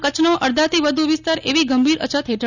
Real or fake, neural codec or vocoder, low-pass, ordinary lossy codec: real; none; 7.2 kHz; none